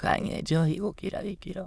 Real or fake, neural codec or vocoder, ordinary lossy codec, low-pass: fake; autoencoder, 22.05 kHz, a latent of 192 numbers a frame, VITS, trained on many speakers; none; none